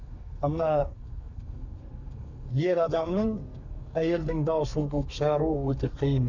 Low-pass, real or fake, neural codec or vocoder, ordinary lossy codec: 7.2 kHz; fake; codec, 32 kHz, 1.9 kbps, SNAC; none